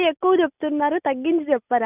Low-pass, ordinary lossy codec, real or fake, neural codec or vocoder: 3.6 kHz; none; real; none